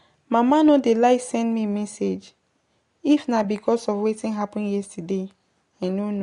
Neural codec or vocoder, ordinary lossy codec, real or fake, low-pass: none; AAC, 48 kbps; real; 10.8 kHz